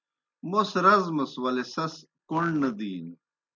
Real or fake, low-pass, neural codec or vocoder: real; 7.2 kHz; none